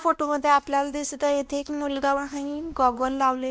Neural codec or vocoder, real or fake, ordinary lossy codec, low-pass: codec, 16 kHz, 1 kbps, X-Codec, WavLM features, trained on Multilingual LibriSpeech; fake; none; none